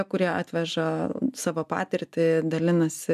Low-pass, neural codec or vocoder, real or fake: 14.4 kHz; none; real